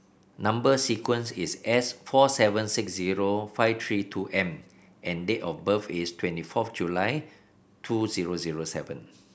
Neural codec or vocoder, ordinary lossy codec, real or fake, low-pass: none; none; real; none